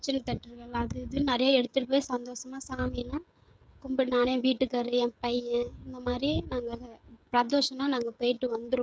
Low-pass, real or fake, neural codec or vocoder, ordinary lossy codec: none; fake; codec, 16 kHz, 16 kbps, FreqCodec, smaller model; none